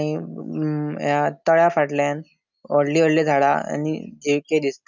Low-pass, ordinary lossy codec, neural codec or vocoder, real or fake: 7.2 kHz; none; none; real